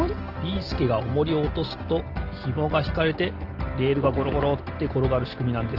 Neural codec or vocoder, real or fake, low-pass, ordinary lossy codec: none; real; 5.4 kHz; Opus, 16 kbps